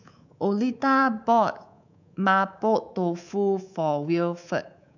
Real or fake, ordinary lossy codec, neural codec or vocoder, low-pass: fake; none; codec, 24 kHz, 3.1 kbps, DualCodec; 7.2 kHz